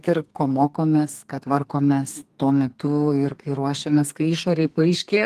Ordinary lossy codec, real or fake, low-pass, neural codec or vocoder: Opus, 24 kbps; fake; 14.4 kHz; codec, 32 kHz, 1.9 kbps, SNAC